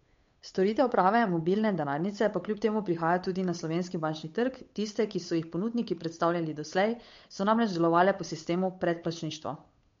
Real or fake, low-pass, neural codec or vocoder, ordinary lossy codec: fake; 7.2 kHz; codec, 16 kHz, 8 kbps, FunCodec, trained on Chinese and English, 25 frames a second; MP3, 48 kbps